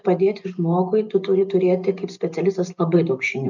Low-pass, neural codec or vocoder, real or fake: 7.2 kHz; none; real